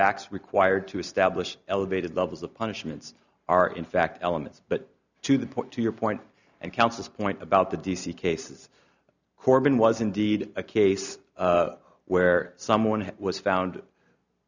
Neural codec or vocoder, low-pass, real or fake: none; 7.2 kHz; real